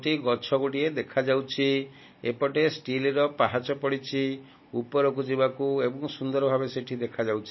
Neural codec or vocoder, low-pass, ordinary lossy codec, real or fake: none; 7.2 kHz; MP3, 24 kbps; real